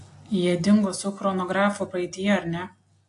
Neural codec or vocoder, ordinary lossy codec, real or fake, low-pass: none; AAC, 48 kbps; real; 10.8 kHz